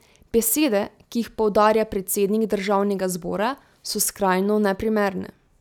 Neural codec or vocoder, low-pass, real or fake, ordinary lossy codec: none; 19.8 kHz; real; none